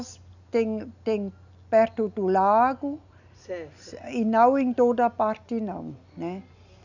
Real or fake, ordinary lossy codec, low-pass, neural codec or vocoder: real; none; 7.2 kHz; none